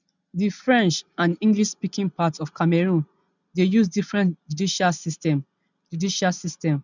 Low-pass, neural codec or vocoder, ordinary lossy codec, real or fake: 7.2 kHz; none; none; real